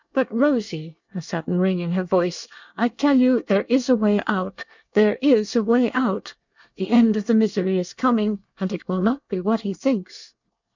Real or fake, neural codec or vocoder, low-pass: fake; codec, 24 kHz, 1 kbps, SNAC; 7.2 kHz